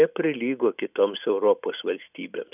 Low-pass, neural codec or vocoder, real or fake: 3.6 kHz; none; real